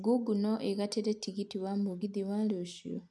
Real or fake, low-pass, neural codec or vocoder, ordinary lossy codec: real; none; none; none